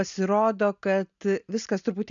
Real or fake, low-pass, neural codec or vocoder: real; 7.2 kHz; none